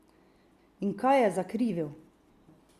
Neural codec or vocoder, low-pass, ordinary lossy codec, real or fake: none; 14.4 kHz; Opus, 64 kbps; real